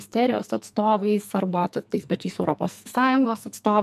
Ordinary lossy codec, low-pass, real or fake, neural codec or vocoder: MP3, 96 kbps; 14.4 kHz; fake; codec, 44.1 kHz, 2.6 kbps, SNAC